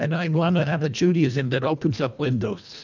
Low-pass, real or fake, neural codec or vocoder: 7.2 kHz; fake; codec, 24 kHz, 1.5 kbps, HILCodec